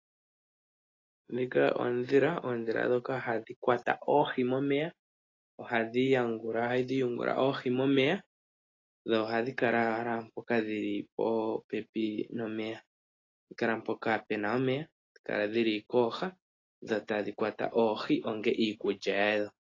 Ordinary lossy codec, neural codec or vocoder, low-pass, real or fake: AAC, 32 kbps; none; 7.2 kHz; real